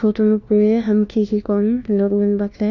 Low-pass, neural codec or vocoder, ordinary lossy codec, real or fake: 7.2 kHz; codec, 16 kHz, 1 kbps, FunCodec, trained on LibriTTS, 50 frames a second; none; fake